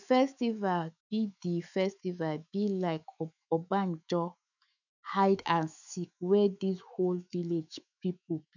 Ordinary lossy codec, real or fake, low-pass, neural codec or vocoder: none; fake; 7.2 kHz; autoencoder, 48 kHz, 128 numbers a frame, DAC-VAE, trained on Japanese speech